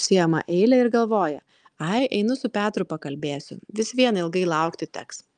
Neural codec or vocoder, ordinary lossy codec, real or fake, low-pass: none; Opus, 32 kbps; real; 9.9 kHz